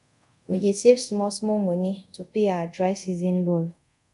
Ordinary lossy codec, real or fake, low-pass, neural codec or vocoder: none; fake; 10.8 kHz; codec, 24 kHz, 0.5 kbps, DualCodec